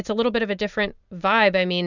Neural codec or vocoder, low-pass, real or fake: none; 7.2 kHz; real